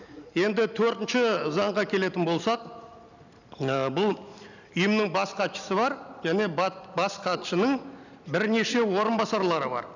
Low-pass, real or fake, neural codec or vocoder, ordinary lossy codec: 7.2 kHz; real; none; none